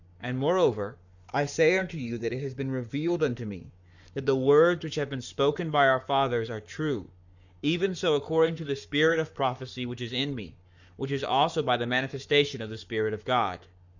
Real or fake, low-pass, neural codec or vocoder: fake; 7.2 kHz; codec, 44.1 kHz, 7.8 kbps, Pupu-Codec